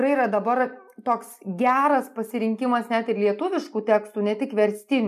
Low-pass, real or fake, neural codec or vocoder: 14.4 kHz; real; none